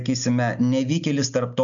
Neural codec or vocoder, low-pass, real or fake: none; 7.2 kHz; real